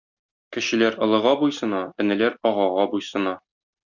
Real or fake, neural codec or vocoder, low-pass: real; none; 7.2 kHz